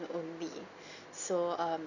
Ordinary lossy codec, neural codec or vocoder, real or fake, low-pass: none; none; real; 7.2 kHz